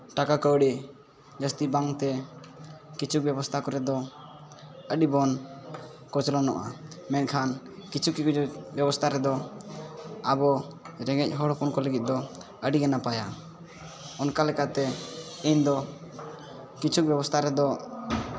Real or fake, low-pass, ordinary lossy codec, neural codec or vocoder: real; none; none; none